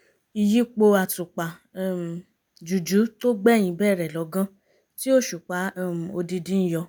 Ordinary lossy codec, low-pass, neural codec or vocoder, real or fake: none; none; none; real